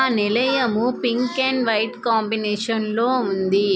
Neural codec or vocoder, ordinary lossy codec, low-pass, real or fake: none; none; none; real